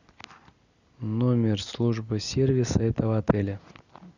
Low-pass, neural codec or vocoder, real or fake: 7.2 kHz; none; real